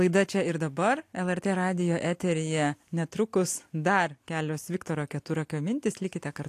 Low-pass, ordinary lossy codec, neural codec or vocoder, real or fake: 14.4 kHz; AAC, 64 kbps; none; real